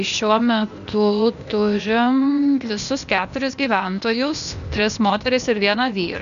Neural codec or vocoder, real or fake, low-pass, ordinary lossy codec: codec, 16 kHz, 0.8 kbps, ZipCodec; fake; 7.2 kHz; MP3, 96 kbps